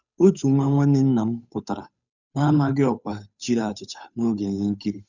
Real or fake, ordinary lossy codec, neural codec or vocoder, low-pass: fake; none; codec, 16 kHz, 8 kbps, FunCodec, trained on Chinese and English, 25 frames a second; 7.2 kHz